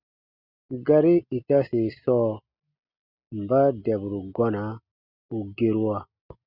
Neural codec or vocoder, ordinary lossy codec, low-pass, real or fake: none; Opus, 64 kbps; 5.4 kHz; real